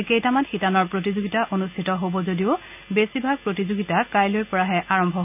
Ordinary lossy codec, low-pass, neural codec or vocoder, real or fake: none; 3.6 kHz; none; real